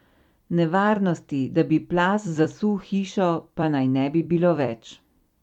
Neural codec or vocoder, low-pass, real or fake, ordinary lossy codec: vocoder, 44.1 kHz, 128 mel bands every 256 samples, BigVGAN v2; 19.8 kHz; fake; MP3, 96 kbps